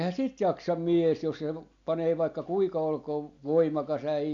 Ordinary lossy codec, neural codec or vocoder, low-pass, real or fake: AAC, 64 kbps; none; 7.2 kHz; real